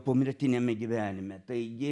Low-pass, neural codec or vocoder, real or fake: 10.8 kHz; none; real